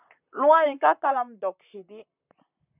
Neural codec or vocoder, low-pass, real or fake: vocoder, 44.1 kHz, 128 mel bands, Pupu-Vocoder; 3.6 kHz; fake